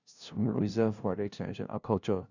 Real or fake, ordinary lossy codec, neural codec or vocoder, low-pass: fake; none; codec, 16 kHz, 0.5 kbps, FunCodec, trained on LibriTTS, 25 frames a second; 7.2 kHz